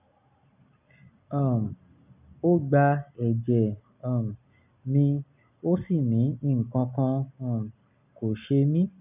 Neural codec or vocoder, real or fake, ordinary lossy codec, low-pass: none; real; none; 3.6 kHz